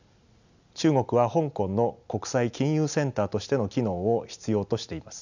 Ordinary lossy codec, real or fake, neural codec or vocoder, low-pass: none; real; none; 7.2 kHz